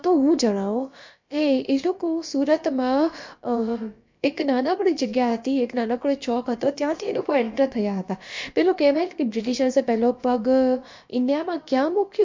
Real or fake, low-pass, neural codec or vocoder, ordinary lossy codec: fake; 7.2 kHz; codec, 16 kHz, about 1 kbps, DyCAST, with the encoder's durations; MP3, 48 kbps